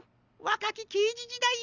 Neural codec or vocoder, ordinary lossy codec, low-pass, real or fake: none; none; 7.2 kHz; real